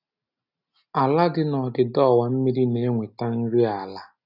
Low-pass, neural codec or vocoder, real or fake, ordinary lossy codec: 5.4 kHz; none; real; none